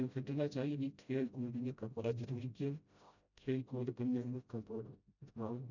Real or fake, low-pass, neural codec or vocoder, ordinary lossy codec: fake; 7.2 kHz; codec, 16 kHz, 0.5 kbps, FreqCodec, smaller model; none